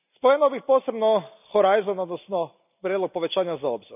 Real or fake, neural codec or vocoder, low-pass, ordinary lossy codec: real; none; 3.6 kHz; none